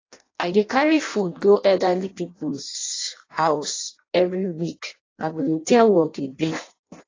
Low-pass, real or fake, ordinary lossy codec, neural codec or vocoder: 7.2 kHz; fake; AAC, 32 kbps; codec, 16 kHz in and 24 kHz out, 0.6 kbps, FireRedTTS-2 codec